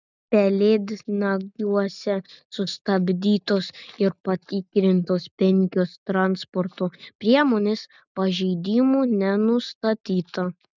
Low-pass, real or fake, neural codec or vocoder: 7.2 kHz; real; none